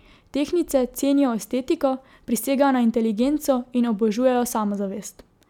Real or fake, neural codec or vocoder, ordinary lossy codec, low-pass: real; none; none; 19.8 kHz